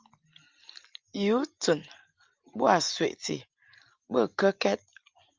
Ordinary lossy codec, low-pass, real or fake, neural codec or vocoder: Opus, 32 kbps; 7.2 kHz; real; none